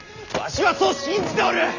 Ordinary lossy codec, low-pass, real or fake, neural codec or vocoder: none; 7.2 kHz; real; none